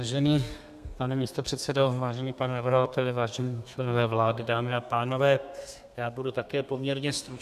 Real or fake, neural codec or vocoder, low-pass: fake; codec, 32 kHz, 1.9 kbps, SNAC; 14.4 kHz